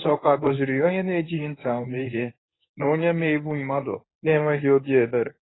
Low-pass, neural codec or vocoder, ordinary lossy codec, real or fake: 7.2 kHz; codec, 24 kHz, 0.9 kbps, WavTokenizer, medium speech release version 1; AAC, 16 kbps; fake